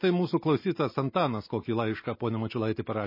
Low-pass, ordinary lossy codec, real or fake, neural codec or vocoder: 5.4 kHz; MP3, 24 kbps; real; none